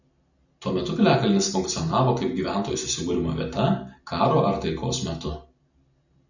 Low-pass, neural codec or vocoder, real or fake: 7.2 kHz; none; real